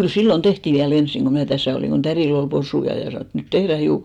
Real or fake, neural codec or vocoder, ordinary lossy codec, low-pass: real; none; none; 19.8 kHz